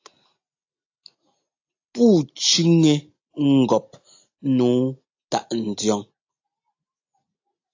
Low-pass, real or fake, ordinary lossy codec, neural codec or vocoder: 7.2 kHz; real; AAC, 48 kbps; none